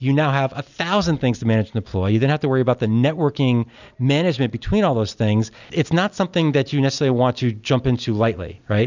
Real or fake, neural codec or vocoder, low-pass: real; none; 7.2 kHz